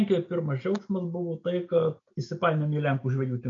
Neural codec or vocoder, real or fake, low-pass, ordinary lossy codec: none; real; 7.2 kHz; MP3, 48 kbps